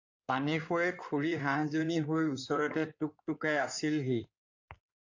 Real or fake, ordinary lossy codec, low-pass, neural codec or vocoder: fake; AAC, 48 kbps; 7.2 kHz; codec, 16 kHz in and 24 kHz out, 2.2 kbps, FireRedTTS-2 codec